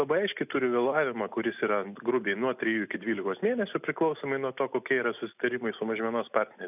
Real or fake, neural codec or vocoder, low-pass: real; none; 3.6 kHz